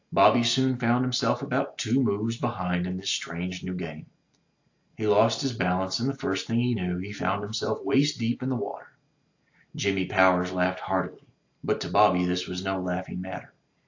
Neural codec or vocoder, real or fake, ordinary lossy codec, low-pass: none; real; AAC, 48 kbps; 7.2 kHz